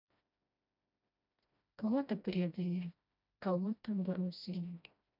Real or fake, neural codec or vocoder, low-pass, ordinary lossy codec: fake; codec, 16 kHz, 1 kbps, FreqCodec, smaller model; 5.4 kHz; none